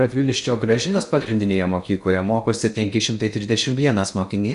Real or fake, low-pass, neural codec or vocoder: fake; 10.8 kHz; codec, 16 kHz in and 24 kHz out, 0.6 kbps, FocalCodec, streaming, 2048 codes